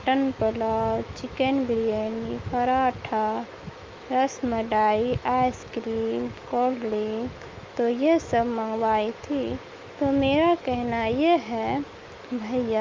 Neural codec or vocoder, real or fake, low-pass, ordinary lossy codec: autoencoder, 48 kHz, 128 numbers a frame, DAC-VAE, trained on Japanese speech; fake; 7.2 kHz; Opus, 24 kbps